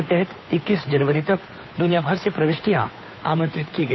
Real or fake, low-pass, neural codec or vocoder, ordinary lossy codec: fake; 7.2 kHz; codec, 16 kHz, 2 kbps, FunCodec, trained on Chinese and English, 25 frames a second; MP3, 24 kbps